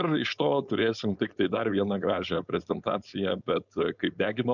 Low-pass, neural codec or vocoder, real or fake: 7.2 kHz; codec, 16 kHz, 4.8 kbps, FACodec; fake